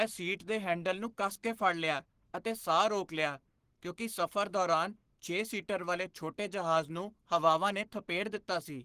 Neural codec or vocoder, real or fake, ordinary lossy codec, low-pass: codec, 44.1 kHz, 7.8 kbps, Pupu-Codec; fake; Opus, 16 kbps; 14.4 kHz